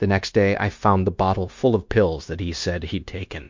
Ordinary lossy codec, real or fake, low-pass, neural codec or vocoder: MP3, 48 kbps; fake; 7.2 kHz; codec, 16 kHz, 0.9 kbps, LongCat-Audio-Codec